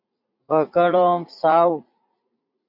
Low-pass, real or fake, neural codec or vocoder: 5.4 kHz; fake; vocoder, 44.1 kHz, 80 mel bands, Vocos